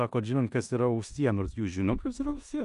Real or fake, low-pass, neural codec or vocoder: fake; 10.8 kHz; codec, 16 kHz in and 24 kHz out, 0.9 kbps, LongCat-Audio-Codec, four codebook decoder